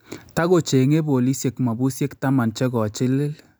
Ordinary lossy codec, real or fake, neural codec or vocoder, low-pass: none; real; none; none